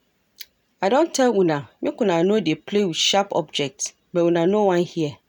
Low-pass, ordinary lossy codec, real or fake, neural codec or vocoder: 19.8 kHz; none; real; none